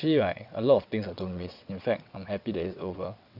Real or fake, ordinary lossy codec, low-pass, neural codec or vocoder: fake; none; 5.4 kHz; vocoder, 22.05 kHz, 80 mel bands, WaveNeXt